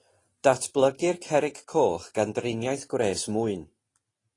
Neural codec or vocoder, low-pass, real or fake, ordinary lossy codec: none; 10.8 kHz; real; AAC, 32 kbps